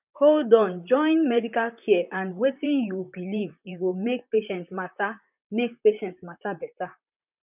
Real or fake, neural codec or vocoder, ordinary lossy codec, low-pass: fake; vocoder, 44.1 kHz, 128 mel bands, Pupu-Vocoder; none; 3.6 kHz